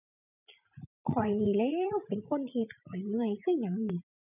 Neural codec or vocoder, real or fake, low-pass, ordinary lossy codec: vocoder, 44.1 kHz, 80 mel bands, Vocos; fake; 3.6 kHz; none